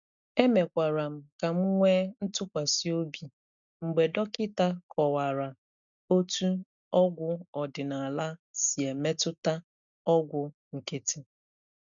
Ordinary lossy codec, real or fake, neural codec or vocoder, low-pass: MP3, 96 kbps; real; none; 7.2 kHz